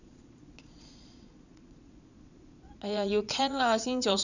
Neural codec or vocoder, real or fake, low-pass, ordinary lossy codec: vocoder, 22.05 kHz, 80 mel bands, Vocos; fake; 7.2 kHz; none